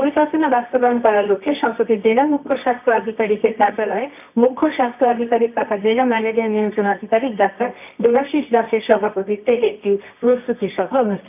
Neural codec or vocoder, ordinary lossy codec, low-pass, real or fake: codec, 24 kHz, 0.9 kbps, WavTokenizer, medium music audio release; none; 3.6 kHz; fake